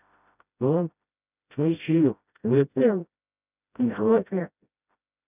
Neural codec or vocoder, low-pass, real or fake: codec, 16 kHz, 0.5 kbps, FreqCodec, smaller model; 3.6 kHz; fake